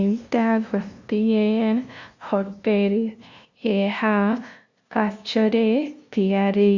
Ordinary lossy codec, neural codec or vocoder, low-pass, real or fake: Opus, 64 kbps; codec, 16 kHz, 0.5 kbps, FunCodec, trained on LibriTTS, 25 frames a second; 7.2 kHz; fake